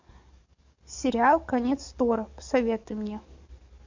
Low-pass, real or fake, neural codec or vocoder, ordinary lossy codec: 7.2 kHz; fake; codec, 44.1 kHz, 7.8 kbps, DAC; MP3, 48 kbps